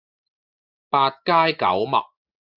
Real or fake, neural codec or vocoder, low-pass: real; none; 5.4 kHz